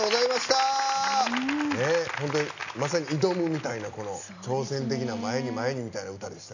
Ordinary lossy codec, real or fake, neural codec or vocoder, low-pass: none; real; none; 7.2 kHz